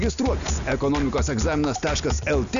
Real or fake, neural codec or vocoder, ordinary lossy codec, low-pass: real; none; AAC, 96 kbps; 7.2 kHz